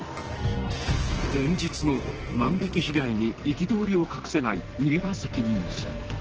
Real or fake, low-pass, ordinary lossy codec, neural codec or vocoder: fake; 7.2 kHz; Opus, 16 kbps; codec, 44.1 kHz, 2.6 kbps, SNAC